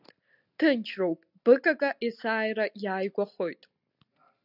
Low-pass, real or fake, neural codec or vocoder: 5.4 kHz; real; none